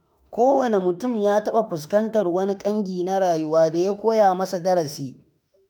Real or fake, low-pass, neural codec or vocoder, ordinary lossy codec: fake; none; autoencoder, 48 kHz, 32 numbers a frame, DAC-VAE, trained on Japanese speech; none